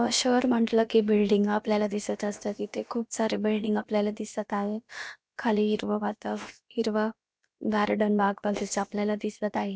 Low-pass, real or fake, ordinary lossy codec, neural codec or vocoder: none; fake; none; codec, 16 kHz, about 1 kbps, DyCAST, with the encoder's durations